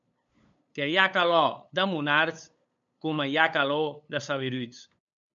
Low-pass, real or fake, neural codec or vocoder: 7.2 kHz; fake; codec, 16 kHz, 8 kbps, FunCodec, trained on LibriTTS, 25 frames a second